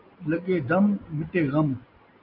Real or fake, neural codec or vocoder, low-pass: real; none; 5.4 kHz